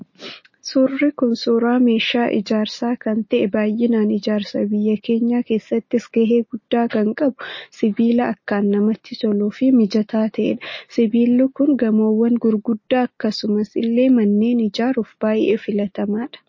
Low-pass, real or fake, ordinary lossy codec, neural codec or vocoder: 7.2 kHz; real; MP3, 32 kbps; none